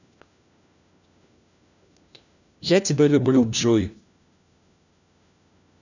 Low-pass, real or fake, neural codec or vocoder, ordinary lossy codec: 7.2 kHz; fake; codec, 16 kHz, 1 kbps, FunCodec, trained on LibriTTS, 50 frames a second; none